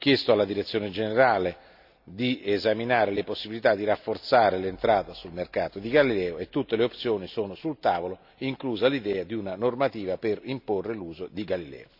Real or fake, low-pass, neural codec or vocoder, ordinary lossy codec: real; 5.4 kHz; none; none